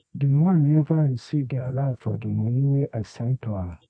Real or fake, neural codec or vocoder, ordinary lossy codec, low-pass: fake; codec, 24 kHz, 0.9 kbps, WavTokenizer, medium music audio release; none; 9.9 kHz